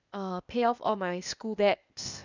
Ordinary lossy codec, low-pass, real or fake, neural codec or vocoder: none; 7.2 kHz; fake; codec, 16 kHz, 0.8 kbps, ZipCodec